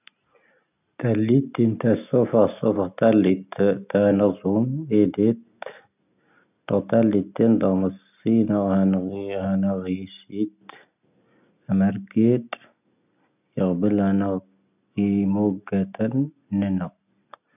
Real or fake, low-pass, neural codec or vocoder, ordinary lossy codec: real; 3.6 kHz; none; none